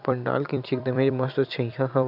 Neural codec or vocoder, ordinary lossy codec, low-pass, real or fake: vocoder, 44.1 kHz, 128 mel bands every 256 samples, BigVGAN v2; none; 5.4 kHz; fake